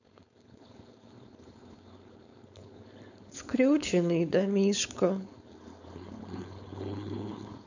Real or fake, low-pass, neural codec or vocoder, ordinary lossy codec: fake; 7.2 kHz; codec, 16 kHz, 4.8 kbps, FACodec; none